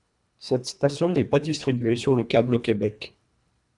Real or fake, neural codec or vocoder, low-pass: fake; codec, 24 kHz, 1.5 kbps, HILCodec; 10.8 kHz